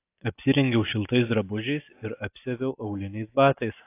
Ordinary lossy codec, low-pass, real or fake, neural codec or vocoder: AAC, 24 kbps; 3.6 kHz; real; none